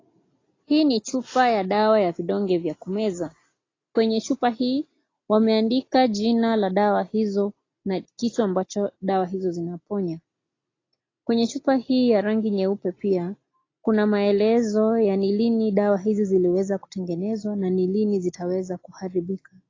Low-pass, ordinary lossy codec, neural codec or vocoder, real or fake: 7.2 kHz; AAC, 32 kbps; none; real